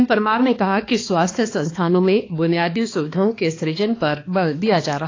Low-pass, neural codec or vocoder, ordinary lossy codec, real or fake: 7.2 kHz; codec, 16 kHz, 2 kbps, X-Codec, HuBERT features, trained on balanced general audio; AAC, 32 kbps; fake